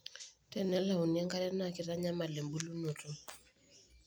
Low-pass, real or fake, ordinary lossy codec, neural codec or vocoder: none; real; none; none